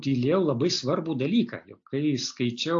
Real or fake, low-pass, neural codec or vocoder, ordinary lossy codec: real; 7.2 kHz; none; AAC, 48 kbps